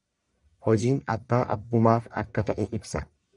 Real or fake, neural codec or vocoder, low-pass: fake; codec, 44.1 kHz, 1.7 kbps, Pupu-Codec; 10.8 kHz